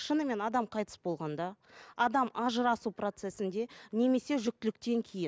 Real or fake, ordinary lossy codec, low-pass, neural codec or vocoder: real; none; none; none